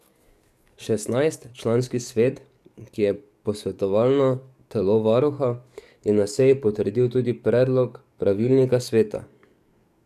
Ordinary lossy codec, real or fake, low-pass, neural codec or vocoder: none; fake; 14.4 kHz; codec, 44.1 kHz, 7.8 kbps, DAC